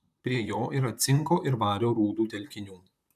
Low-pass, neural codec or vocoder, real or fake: 14.4 kHz; vocoder, 44.1 kHz, 128 mel bands, Pupu-Vocoder; fake